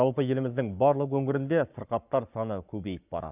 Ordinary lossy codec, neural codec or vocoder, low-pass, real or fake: none; codec, 44.1 kHz, 7.8 kbps, Pupu-Codec; 3.6 kHz; fake